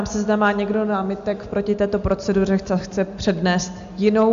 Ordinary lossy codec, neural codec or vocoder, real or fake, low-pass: MP3, 64 kbps; none; real; 7.2 kHz